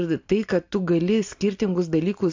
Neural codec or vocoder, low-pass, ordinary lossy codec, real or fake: none; 7.2 kHz; AAC, 48 kbps; real